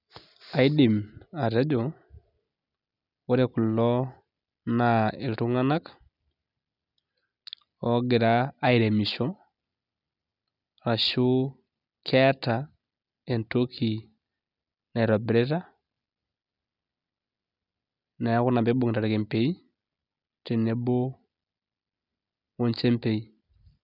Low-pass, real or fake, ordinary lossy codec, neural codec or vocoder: 5.4 kHz; real; none; none